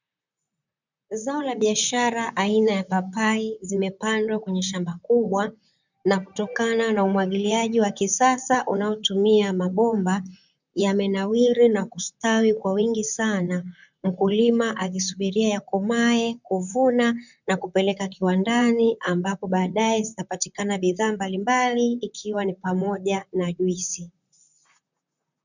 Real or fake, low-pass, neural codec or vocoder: fake; 7.2 kHz; vocoder, 44.1 kHz, 128 mel bands, Pupu-Vocoder